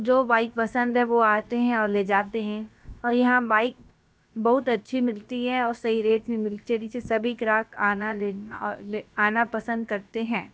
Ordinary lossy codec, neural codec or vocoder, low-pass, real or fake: none; codec, 16 kHz, about 1 kbps, DyCAST, with the encoder's durations; none; fake